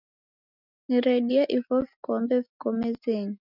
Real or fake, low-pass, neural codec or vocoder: real; 5.4 kHz; none